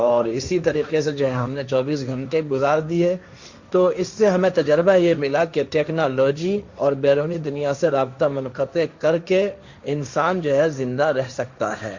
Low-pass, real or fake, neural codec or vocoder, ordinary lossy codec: 7.2 kHz; fake; codec, 16 kHz, 1.1 kbps, Voila-Tokenizer; Opus, 64 kbps